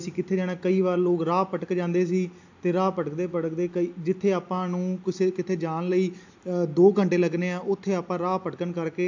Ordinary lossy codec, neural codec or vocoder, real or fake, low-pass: AAC, 48 kbps; none; real; 7.2 kHz